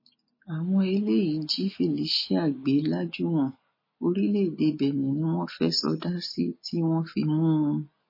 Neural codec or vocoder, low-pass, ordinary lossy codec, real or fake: none; 5.4 kHz; MP3, 24 kbps; real